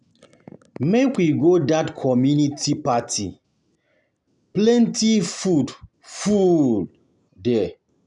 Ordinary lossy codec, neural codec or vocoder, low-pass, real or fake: none; none; 10.8 kHz; real